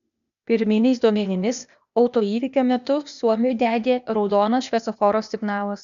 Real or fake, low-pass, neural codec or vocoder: fake; 7.2 kHz; codec, 16 kHz, 0.8 kbps, ZipCodec